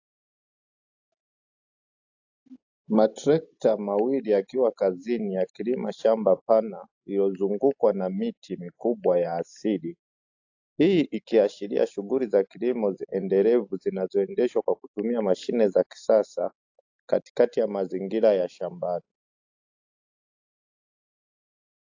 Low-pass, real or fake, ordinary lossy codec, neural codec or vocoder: 7.2 kHz; real; AAC, 48 kbps; none